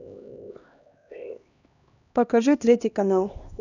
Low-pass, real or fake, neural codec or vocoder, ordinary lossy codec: 7.2 kHz; fake; codec, 16 kHz, 1 kbps, X-Codec, HuBERT features, trained on LibriSpeech; none